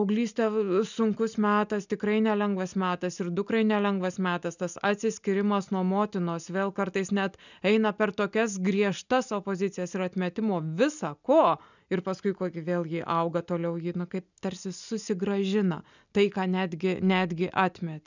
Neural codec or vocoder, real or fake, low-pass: none; real; 7.2 kHz